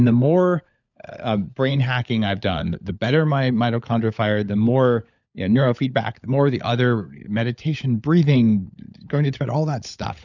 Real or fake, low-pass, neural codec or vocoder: fake; 7.2 kHz; codec, 16 kHz, 16 kbps, FunCodec, trained on LibriTTS, 50 frames a second